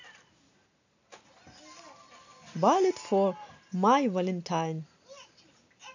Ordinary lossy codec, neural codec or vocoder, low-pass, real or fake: none; none; 7.2 kHz; real